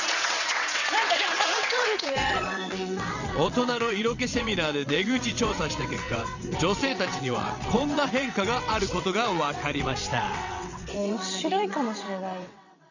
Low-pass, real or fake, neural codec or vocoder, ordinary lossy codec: 7.2 kHz; fake; vocoder, 22.05 kHz, 80 mel bands, WaveNeXt; none